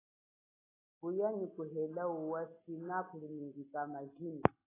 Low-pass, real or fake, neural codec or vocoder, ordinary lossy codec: 3.6 kHz; real; none; AAC, 16 kbps